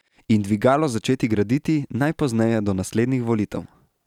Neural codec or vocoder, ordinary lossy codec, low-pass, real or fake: none; none; 19.8 kHz; real